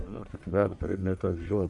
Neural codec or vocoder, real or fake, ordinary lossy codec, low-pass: codec, 44.1 kHz, 1.7 kbps, Pupu-Codec; fake; MP3, 96 kbps; 10.8 kHz